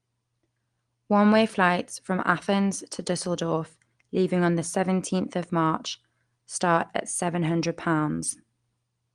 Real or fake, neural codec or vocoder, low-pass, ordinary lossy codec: real; none; 9.9 kHz; Opus, 24 kbps